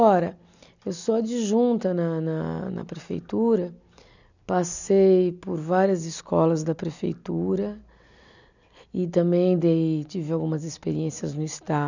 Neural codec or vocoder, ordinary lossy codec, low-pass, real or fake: none; none; 7.2 kHz; real